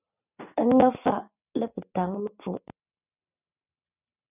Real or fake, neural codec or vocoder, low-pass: real; none; 3.6 kHz